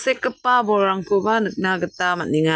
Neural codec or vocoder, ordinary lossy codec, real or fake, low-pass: none; none; real; none